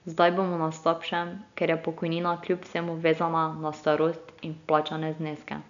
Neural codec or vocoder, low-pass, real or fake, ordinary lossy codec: none; 7.2 kHz; real; none